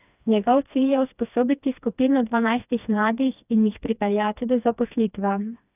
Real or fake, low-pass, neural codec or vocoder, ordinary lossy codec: fake; 3.6 kHz; codec, 16 kHz, 2 kbps, FreqCodec, smaller model; none